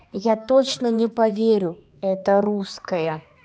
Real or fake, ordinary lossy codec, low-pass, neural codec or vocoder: fake; none; none; codec, 16 kHz, 2 kbps, X-Codec, HuBERT features, trained on balanced general audio